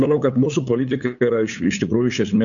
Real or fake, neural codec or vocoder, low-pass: fake; codec, 16 kHz, 8 kbps, FunCodec, trained on LibriTTS, 25 frames a second; 7.2 kHz